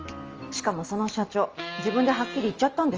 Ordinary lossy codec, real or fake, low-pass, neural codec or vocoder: Opus, 24 kbps; real; 7.2 kHz; none